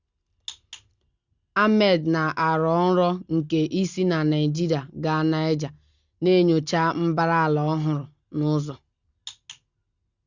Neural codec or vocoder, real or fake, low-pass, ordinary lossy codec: none; real; 7.2 kHz; Opus, 64 kbps